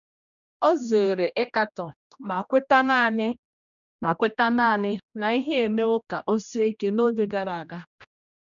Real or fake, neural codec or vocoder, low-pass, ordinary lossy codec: fake; codec, 16 kHz, 1 kbps, X-Codec, HuBERT features, trained on general audio; 7.2 kHz; none